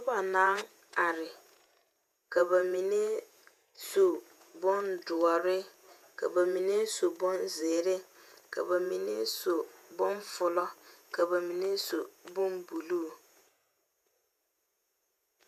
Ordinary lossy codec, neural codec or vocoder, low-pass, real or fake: MP3, 96 kbps; vocoder, 48 kHz, 128 mel bands, Vocos; 14.4 kHz; fake